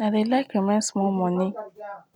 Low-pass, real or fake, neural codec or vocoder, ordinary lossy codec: 19.8 kHz; real; none; none